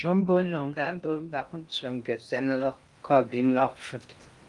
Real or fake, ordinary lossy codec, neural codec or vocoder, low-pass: fake; Opus, 32 kbps; codec, 16 kHz in and 24 kHz out, 0.6 kbps, FocalCodec, streaming, 4096 codes; 10.8 kHz